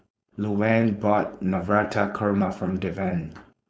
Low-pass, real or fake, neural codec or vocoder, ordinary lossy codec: none; fake; codec, 16 kHz, 4.8 kbps, FACodec; none